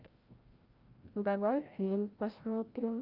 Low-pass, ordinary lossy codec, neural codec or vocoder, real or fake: 5.4 kHz; Opus, 24 kbps; codec, 16 kHz, 0.5 kbps, FreqCodec, larger model; fake